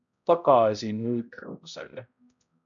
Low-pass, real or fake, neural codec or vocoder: 7.2 kHz; fake; codec, 16 kHz, 0.5 kbps, X-Codec, HuBERT features, trained on balanced general audio